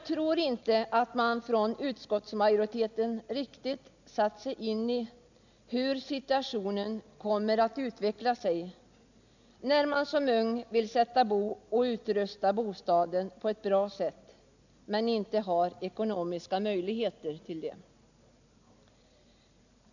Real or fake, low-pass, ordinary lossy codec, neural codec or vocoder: real; 7.2 kHz; none; none